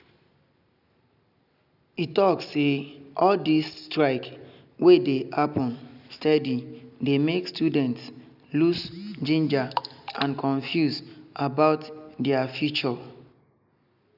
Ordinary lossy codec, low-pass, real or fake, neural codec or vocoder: none; 5.4 kHz; real; none